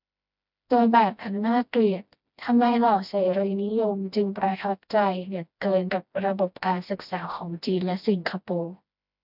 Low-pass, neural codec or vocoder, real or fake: 5.4 kHz; codec, 16 kHz, 1 kbps, FreqCodec, smaller model; fake